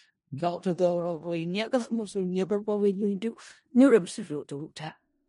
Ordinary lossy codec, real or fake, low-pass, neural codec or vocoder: MP3, 48 kbps; fake; 10.8 kHz; codec, 16 kHz in and 24 kHz out, 0.4 kbps, LongCat-Audio-Codec, four codebook decoder